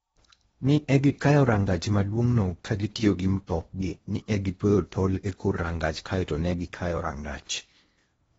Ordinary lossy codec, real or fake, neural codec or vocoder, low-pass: AAC, 24 kbps; fake; codec, 16 kHz in and 24 kHz out, 0.8 kbps, FocalCodec, streaming, 65536 codes; 10.8 kHz